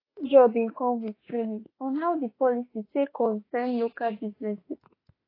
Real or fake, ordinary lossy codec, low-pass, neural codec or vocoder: fake; AAC, 24 kbps; 5.4 kHz; autoencoder, 48 kHz, 32 numbers a frame, DAC-VAE, trained on Japanese speech